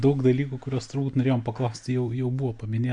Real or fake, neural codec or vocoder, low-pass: real; none; 9.9 kHz